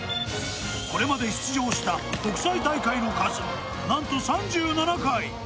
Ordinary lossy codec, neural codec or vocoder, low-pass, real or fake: none; none; none; real